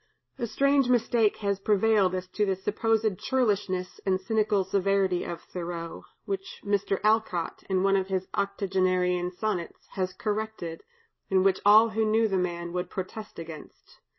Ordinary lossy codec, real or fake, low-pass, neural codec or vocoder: MP3, 24 kbps; real; 7.2 kHz; none